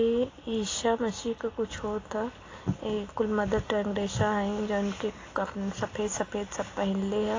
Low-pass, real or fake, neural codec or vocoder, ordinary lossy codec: 7.2 kHz; real; none; AAC, 32 kbps